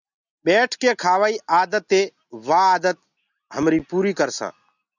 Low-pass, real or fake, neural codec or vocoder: 7.2 kHz; real; none